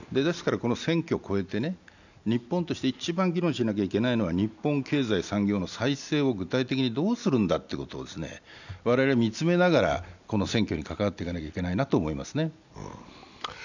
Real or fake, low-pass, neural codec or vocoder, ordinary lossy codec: real; 7.2 kHz; none; none